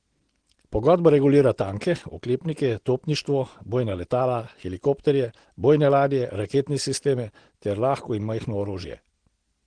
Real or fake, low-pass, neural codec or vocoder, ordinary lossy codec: real; 9.9 kHz; none; Opus, 16 kbps